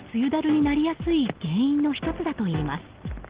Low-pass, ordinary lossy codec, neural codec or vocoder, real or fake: 3.6 kHz; Opus, 16 kbps; none; real